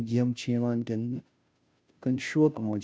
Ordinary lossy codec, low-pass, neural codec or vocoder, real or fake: none; none; codec, 16 kHz, 0.5 kbps, FunCodec, trained on Chinese and English, 25 frames a second; fake